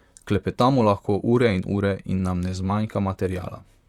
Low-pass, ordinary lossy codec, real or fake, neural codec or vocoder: 19.8 kHz; Opus, 64 kbps; fake; vocoder, 44.1 kHz, 128 mel bands, Pupu-Vocoder